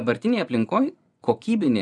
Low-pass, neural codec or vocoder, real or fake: 10.8 kHz; vocoder, 24 kHz, 100 mel bands, Vocos; fake